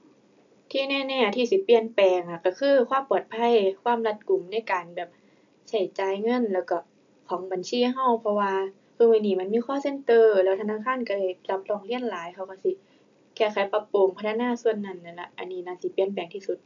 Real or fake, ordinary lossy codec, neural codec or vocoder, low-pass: real; AAC, 64 kbps; none; 7.2 kHz